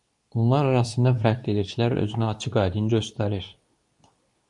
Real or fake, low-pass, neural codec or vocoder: fake; 10.8 kHz; codec, 24 kHz, 0.9 kbps, WavTokenizer, medium speech release version 2